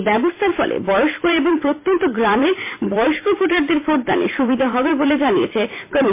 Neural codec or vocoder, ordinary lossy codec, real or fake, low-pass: vocoder, 44.1 kHz, 128 mel bands every 256 samples, BigVGAN v2; MP3, 24 kbps; fake; 3.6 kHz